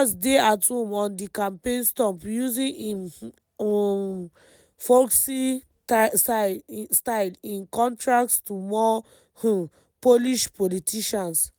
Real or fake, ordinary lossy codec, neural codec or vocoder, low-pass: real; none; none; none